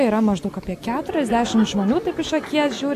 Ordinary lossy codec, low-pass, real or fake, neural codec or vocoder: AAC, 96 kbps; 14.4 kHz; real; none